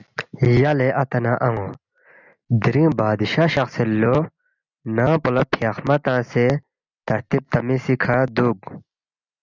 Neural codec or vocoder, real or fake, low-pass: none; real; 7.2 kHz